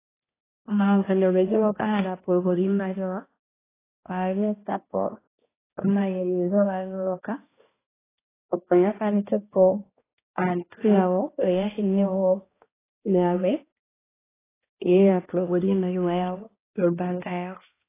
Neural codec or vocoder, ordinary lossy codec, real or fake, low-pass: codec, 16 kHz, 1 kbps, X-Codec, HuBERT features, trained on balanced general audio; AAC, 16 kbps; fake; 3.6 kHz